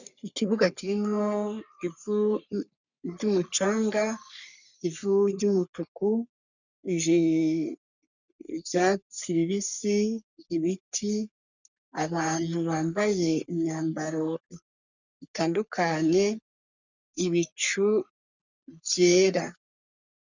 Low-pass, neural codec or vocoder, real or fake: 7.2 kHz; codec, 44.1 kHz, 3.4 kbps, Pupu-Codec; fake